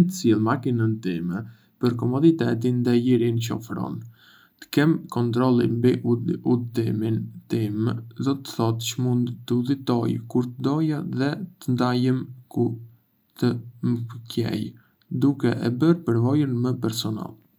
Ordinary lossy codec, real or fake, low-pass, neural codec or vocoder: none; real; none; none